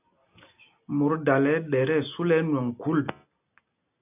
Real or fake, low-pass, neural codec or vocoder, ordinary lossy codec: real; 3.6 kHz; none; AAC, 24 kbps